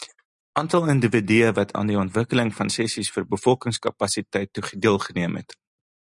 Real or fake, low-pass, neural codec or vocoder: real; 10.8 kHz; none